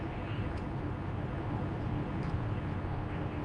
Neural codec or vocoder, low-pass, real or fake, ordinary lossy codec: codec, 24 kHz, 0.9 kbps, WavTokenizer, medium speech release version 2; 9.9 kHz; fake; none